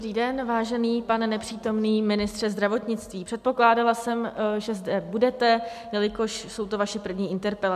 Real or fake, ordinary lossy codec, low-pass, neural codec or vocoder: real; MP3, 96 kbps; 14.4 kHz; none